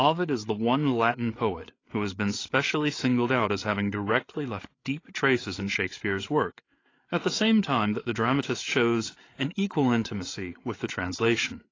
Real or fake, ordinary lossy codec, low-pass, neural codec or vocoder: fake; AAC, 32 kbps; 7.2 kHz; codec, 16 kHz, 8 kbps, FreqCodec, larger model